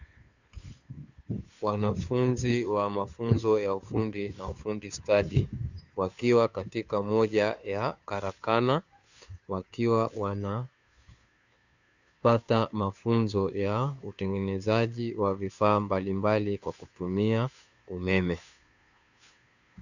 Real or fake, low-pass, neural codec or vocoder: fake; 7.2 kHz; codec, 16 kHz, 2 kbps, FunCodec, trained on Chinese and English, 25 frames a second